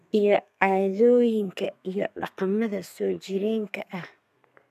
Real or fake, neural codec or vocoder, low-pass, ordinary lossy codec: fake; codec, 32 kHz, 1.9 kbps, SNAC; 14.4 kHz; none